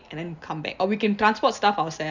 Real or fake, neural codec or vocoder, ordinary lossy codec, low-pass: real; none; none; 7.2 kHz